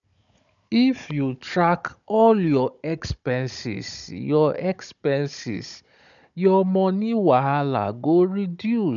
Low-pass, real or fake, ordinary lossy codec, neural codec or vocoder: 7.2 kHz; fake; none; codec, 16 kHz, 16 kbps, FunCodec, trained on Chinese and English, 50 frames a second